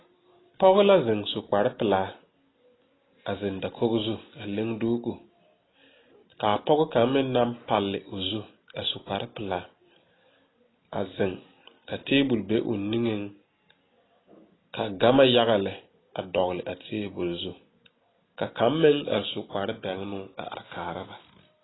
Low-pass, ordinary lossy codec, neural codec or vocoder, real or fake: 7.2 kHz; AAC, 16 kbps; none; real